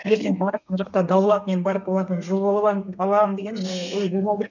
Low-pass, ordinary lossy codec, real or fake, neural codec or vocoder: 7.2 kHz; none; fake; codec, 32 kHz, 1.9 kbps, SNAC